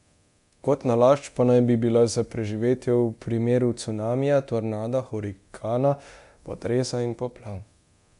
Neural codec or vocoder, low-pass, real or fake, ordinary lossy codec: codec, 24 kHz, 0.9 kbps, DualCodec; 10.8 kHz; fake; none